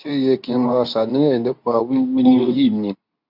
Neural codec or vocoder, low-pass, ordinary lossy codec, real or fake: codec, 24 kHz, 0.9 kbps, WavTokenizer, medium speech release version 2; 5.4 kHz; none; fake